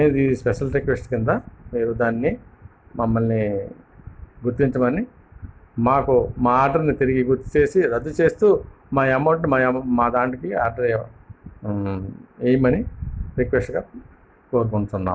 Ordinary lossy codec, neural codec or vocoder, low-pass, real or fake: none; none; none; real